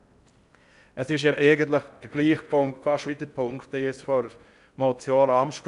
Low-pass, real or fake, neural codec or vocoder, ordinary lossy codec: 10.8 kHz; fake; codec, 16 kHz in and 24 kHz out, 0.6 kbps, FocalCodec, streaming, 4096 codes; none